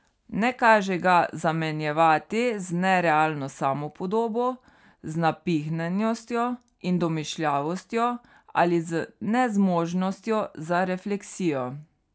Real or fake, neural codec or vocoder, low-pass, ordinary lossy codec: real; none; none; none